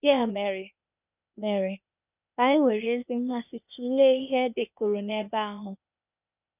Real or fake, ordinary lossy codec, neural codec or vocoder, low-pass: fake; none; codec, 16 kHz, 0.8 kbps, ZipCodec; 3.6 kHz